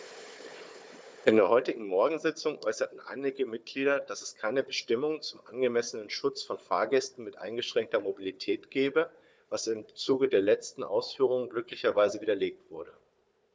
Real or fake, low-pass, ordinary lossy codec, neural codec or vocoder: fake; none; none; codec, 16 kHz, 4 kbps, FunCodec, trained on Chinese and English, 50 frames a second